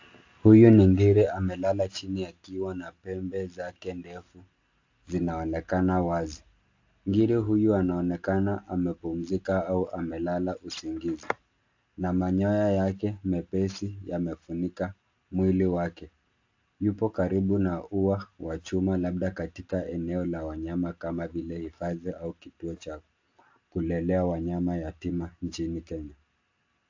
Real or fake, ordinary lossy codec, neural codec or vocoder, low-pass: real; AAC, 48 kbps; none; 7.2 kHz